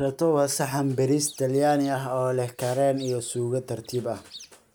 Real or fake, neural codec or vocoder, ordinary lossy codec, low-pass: real; none; none; none